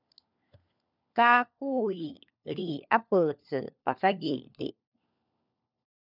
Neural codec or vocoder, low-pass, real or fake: codec, 16 kHz, 4 kbps, FunCodec, trained on LibriTTS, 50 frames a second; 5.4 kHz; fake